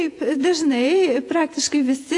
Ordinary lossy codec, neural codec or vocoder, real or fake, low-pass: AAC, 48 kbps; vocoder, 48 kHz, 128 mel bands, Vocos; fake; 10.8 kHz